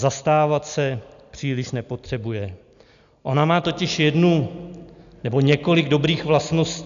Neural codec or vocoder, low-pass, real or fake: none; 7.2 kHz; real